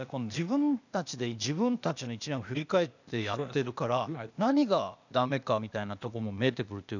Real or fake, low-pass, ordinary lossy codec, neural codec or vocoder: fake; 7.2 kHz; none; codec, 16 kHz, 0.8 kbps, ZipCodec